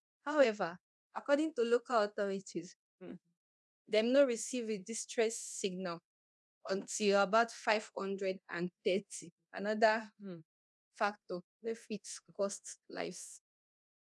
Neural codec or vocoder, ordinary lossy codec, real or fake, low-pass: codec, 24 kHz, 0.9 kbps, DualCodec; none; fake; none